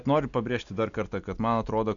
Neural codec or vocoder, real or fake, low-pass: none; real; 7.2 kHz